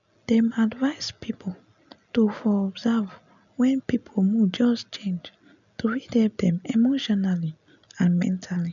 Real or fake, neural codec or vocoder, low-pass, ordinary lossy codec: real; none; 7.2 kHz; none